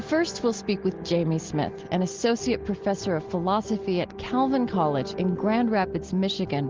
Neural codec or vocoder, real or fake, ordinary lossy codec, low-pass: none; real; Opus, 16 kbps; 7.2 kHz